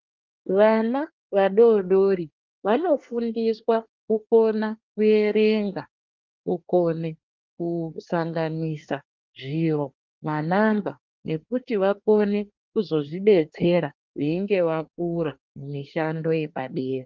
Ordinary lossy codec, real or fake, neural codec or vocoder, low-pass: Opus, 24 kbps; fake; codec, 24 kHz, 1 kbps, SNAC; 7.2 kHz